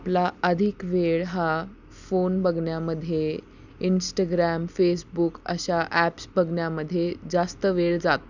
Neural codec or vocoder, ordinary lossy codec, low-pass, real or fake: none; none; 7.2 kHz; real